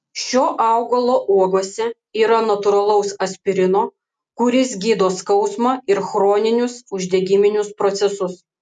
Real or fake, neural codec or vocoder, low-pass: real; none; 9.9 kHz